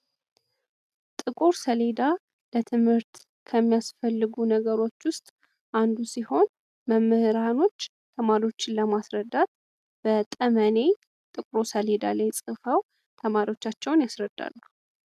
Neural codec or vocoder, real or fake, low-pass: none; real; 14.4 kHz